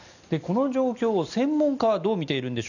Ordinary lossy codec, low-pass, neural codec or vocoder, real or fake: none; 7.2 kHz; none; real